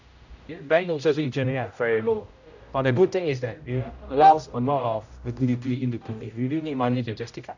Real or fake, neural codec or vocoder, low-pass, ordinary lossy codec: fake; codec, 16 kHz, 0.5 kbps, X-Codec, HuBERT features, trained on general audio; 7.2 kHz; none